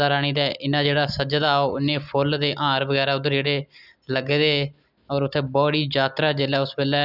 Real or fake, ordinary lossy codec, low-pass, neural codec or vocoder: real; none; 5.4 kHz; none